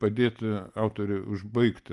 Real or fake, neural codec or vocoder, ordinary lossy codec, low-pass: real; none; Opus, 32 kbps; 10.8 kHz